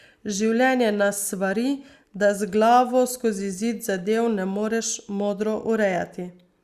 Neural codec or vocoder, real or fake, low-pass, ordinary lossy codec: none; real; 14.4 kHz; Opus, 64 kbps